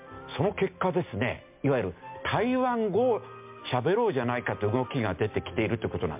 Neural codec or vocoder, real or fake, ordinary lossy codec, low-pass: none; real; MP3, 32 kbps; 3.6 kHz